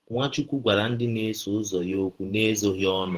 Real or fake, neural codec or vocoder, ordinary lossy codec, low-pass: fake; vocoder, 48 kHz, 128 mel bands, Vocos; Opus, 16 kbps; 14.4 kHz